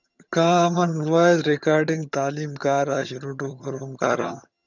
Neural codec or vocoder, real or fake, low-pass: vocoder, 22.05 kHz, 80 mel bands, HiFi-GAN; fake; 7.2 kHz